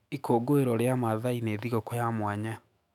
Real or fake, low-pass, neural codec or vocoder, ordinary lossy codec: fake; 19.8 kHz; autoencoder, 48 kHz, 128 numbers a frame, DAC-VAE, trained on Japanese speech; none